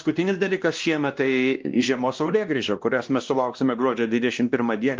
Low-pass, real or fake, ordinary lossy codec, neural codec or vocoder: 7.2 kHz; fake; Opus, 24 kbps; codec, 16 kHz, 1 kbps, X-Codec, WavLM features, trained on Multilingual LibriSpeech